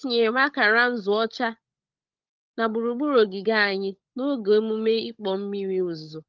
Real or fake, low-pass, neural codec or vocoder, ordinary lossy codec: fake; 7.2 kHz; codec, 16 kHz, 16 kbps, FunCodec, trained on LibriTTS, 50 frames a second; Opus, 32 kbps